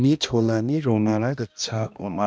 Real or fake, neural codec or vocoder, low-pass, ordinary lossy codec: fake; codec, 16 kHz, 1 kbps, X-Codec, HuBERT features, trained on balanced general audio; none; none